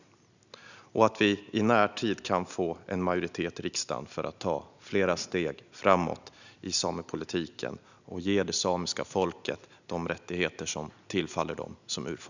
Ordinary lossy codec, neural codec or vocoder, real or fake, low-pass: none; none; real; 7.2 kHz